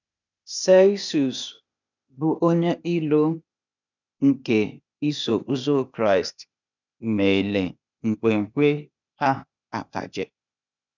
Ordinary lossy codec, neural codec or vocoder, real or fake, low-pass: none; codec, 16 kHz, 0.8 kbps, ZipCodec; fake; 7.2 kHz